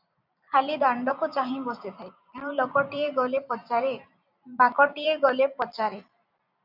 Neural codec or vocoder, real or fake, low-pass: vocoder, 44.1 kHz, 128 mel bands every 256 samples, BigVGAN v2; fake; 5.4 kHz